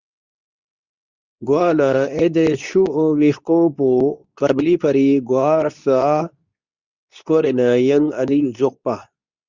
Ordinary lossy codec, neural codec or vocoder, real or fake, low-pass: Opus, 64 kbps; codec, 24 kHz, 0.9 kbps, WavTokenizer, medium speech release version 2; fake; 7.2 kHz